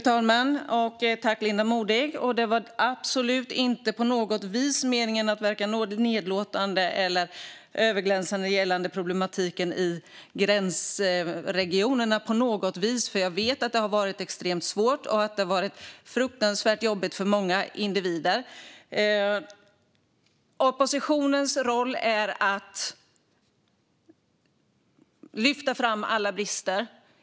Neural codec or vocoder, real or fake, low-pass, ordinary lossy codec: none; real; none; none